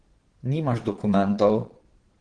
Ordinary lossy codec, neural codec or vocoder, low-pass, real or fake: Opus, 16 kbps; vocoder, 22.05 kHz, 80 mel bands, Vocos; 9.9 kHz; fake